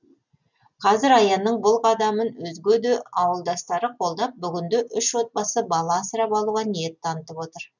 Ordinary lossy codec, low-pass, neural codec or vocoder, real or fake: none; 7.2 kHz; none; real